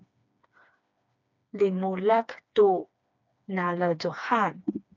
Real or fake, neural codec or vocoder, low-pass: fake; codec, 16 kHz, 2 kbps, FreqCodec, smaller model; 7.2 kHz